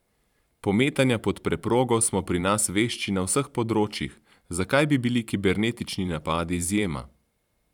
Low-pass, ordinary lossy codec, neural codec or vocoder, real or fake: 19.8 kHz; none; vocoder, 44.1 kHz, 128 mel bands every 512 samples, BigVGAN v2; fake